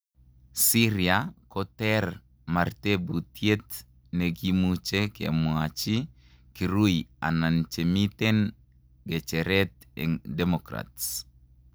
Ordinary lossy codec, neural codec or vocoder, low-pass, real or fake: none; none; none; real